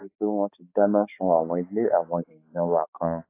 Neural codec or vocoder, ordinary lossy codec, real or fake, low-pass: codec, 16 kHz, 4 kbps, X-Codec, HuBERT features, trained on balanced general audio; AAC, 24 kbps; fake; 3.6 kHz